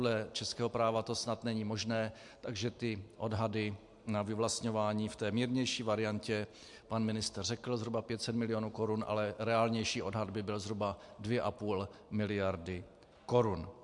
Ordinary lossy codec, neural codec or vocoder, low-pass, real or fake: MP3, 64 kbps; none; 10.8 kHz; real